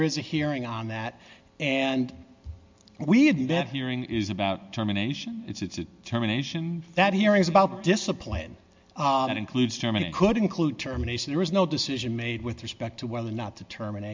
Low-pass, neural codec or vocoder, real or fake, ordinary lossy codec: 7.2 kHz; none; real; MP3, 64 kbps